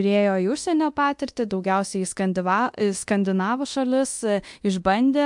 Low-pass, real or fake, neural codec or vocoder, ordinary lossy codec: 10.8 kHz; fake; codec, 24 kHz, 0.9 kbps, DualCodec; MP3, 64 kbps